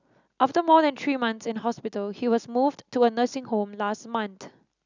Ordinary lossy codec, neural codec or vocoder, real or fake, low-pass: none; none; real; 7.2 kHz